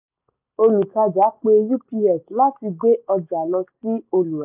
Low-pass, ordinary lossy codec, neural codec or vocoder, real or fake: 3.6 kHz; none; none; real